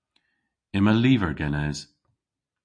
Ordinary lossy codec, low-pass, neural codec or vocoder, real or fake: MP3, 48 kbps; 9.9 kHz; none; real